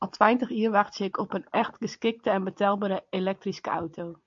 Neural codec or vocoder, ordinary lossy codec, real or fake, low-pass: none; MP3, 64 kbps; real; 7.2 kHz